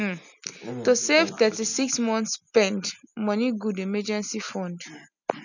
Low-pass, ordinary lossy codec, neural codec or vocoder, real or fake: 7.2 kHz; none; none; real